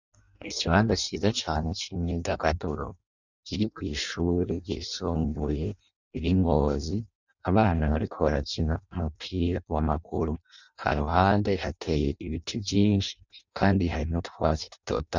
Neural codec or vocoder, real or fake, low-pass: codec, 16 kHz in and 24 kHz out, 0.6 kbps, FireRedTTS-2 codec; fake; 7.2 kHz